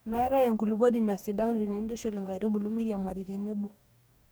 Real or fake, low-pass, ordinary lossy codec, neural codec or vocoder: fake; none; none; codec, 44.1 kHz, 2.6 kbps, DAC